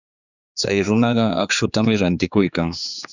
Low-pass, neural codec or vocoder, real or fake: 7.2 kHz; codec, 16 kHz, 4 kbps, X-Codec, HuBERT features, trained on general audio; fake